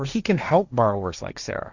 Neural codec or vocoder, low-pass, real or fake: codec, 16 kHz, 1.1 kbps, Voila-Tokenizer; 7.2 kHz; fake